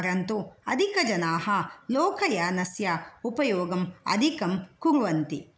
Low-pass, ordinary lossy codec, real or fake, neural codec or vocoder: none; none; real; none